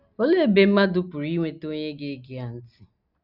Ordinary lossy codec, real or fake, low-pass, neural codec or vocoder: none; real; 5.4 kHz; none